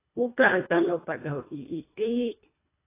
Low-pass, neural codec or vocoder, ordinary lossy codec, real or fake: 3.6 kHz; codec, 24 kHz, 1.5 kbps, HILCodec; AAC, 16 kbps; fake